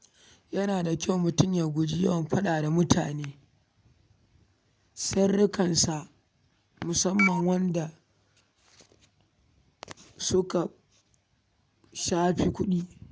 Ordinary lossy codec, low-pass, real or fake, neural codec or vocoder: none; none; real; none